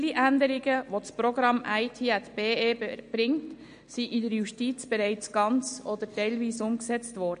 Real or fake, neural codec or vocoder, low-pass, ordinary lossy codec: real; none; 9.9 kHz; MP3, 48 kbps